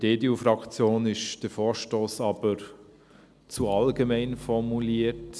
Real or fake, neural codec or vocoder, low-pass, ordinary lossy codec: real; none; none; none